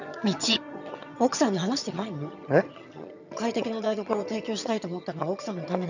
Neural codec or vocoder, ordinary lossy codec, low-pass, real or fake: vocoder, 22.05 kHz, 80 mel bands, HiFi-GAN; none; 7.2 kHz; fake